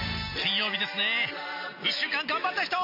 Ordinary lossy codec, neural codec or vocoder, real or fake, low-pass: MP3, 48 kbps; none; real; 5.4 kHz